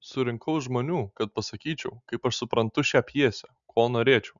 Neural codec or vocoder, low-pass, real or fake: none; 7.2 kHz; real